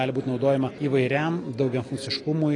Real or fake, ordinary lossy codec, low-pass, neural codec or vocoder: real; AAC, 32 kbps; 10.8 kHz; none